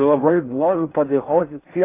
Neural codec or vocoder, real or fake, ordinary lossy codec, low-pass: codec, 16 kHz in and 24 kHz out, 0.6 kbps, FocalCodec, streaming, 4096 codes; fake; AAC, 24 kbps; 3.6 kHz